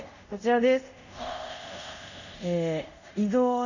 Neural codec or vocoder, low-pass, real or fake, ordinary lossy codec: codec, 24 kHz, 0.5 kbps, DualCodec; 7.2 kHz; fake; none